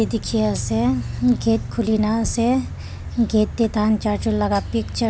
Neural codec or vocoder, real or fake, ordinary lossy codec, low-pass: none; real; none; none